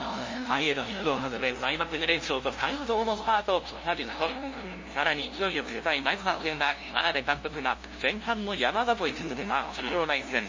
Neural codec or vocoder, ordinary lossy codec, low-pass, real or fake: codec, 16 kHz, 0.5 kbps, FunCodec, trained on LibriTTS, 25 frames a second; MP3, 32 kbps; 7.2 kHz; fake